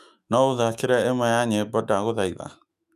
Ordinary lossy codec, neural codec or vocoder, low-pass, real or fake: none; autoencoder, 48 kHz, 128 numbers a frame, DAC-VAE, trained on Japanese speech; 14.4 kHz; fake